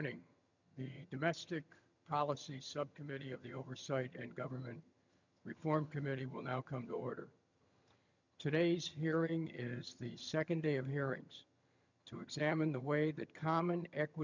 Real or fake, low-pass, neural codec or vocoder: fake; 7.2 kHz; vocoder, 22.05 kHz, 80 mel bands, HiFi-GAN